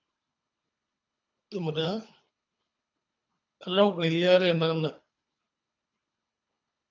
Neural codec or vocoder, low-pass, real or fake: codec, 24 kHz, 3 kbps, HILCodec; 7.2 kHz; fake